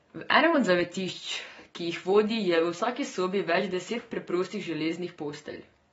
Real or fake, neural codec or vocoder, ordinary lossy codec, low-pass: real; none; AAC, 24 kbps; 19.8 kHz